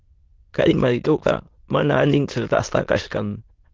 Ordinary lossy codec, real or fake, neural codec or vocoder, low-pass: Opus, 16 kbps; fake; autoencoder, 22.05 kHz, a latent of 192 numbers a frame, VITS, trained on many speakers; 7.2 kHz